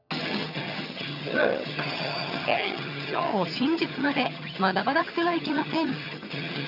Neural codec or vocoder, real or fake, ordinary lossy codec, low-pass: vocoder, 22.05 kHz, 80 mel bands, HiFi-GAN; fake; none; 5.4 kHz